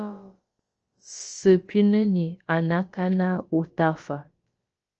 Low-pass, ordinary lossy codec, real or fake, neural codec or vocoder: 7.2 kHz; Opus, 24 kbps; fake; codec, 16 kHz, about 1 kbps, DyCAST, with the encoder's durations